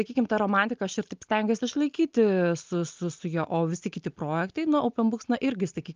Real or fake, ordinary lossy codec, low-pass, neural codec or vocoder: real; Opus, 32 kbps; 7.2 kHz; none